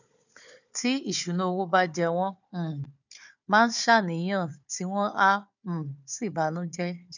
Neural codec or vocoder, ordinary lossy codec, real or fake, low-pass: codec, 16 kHz, 4 kbps, FunCodec, trained on Chinese and English, 50 frames a second; none; fake; 7.2 kHz